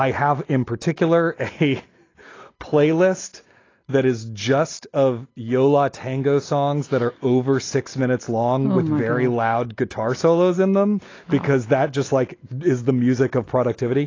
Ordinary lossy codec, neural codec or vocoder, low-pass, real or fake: AAC, 32 kbps; none; 7.2 kHz; real